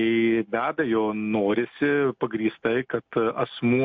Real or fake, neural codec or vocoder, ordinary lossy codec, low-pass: real; none; MP3, 48 kbps; 7.2 kHz